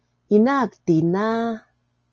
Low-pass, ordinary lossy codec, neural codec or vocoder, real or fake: 7.2 kHz; Opus, 24 kbps; none; real